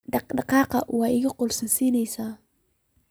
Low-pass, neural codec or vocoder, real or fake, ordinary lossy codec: none; none; real; none